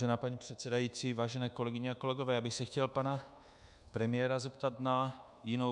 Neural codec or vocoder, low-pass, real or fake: codec, 24 kHz, 1.2 kbps, DualCodec; 10.8 kHz; fake